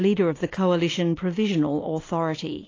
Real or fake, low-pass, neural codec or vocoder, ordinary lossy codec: real; 7.2 kHz; none; AAC, 32 kbps